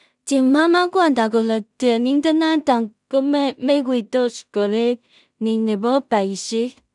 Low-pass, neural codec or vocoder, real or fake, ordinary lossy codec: 10.8 kHz; codec, 16 kHz in and 24 kHz out, 0.4 kbps, LongCat-Audio-Codec, two codebook decoder; fake; none